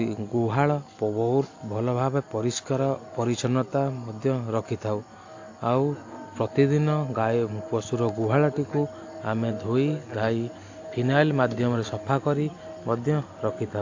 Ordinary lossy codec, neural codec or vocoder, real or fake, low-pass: AAC, 48 kbps; none; real; 7.2 kHz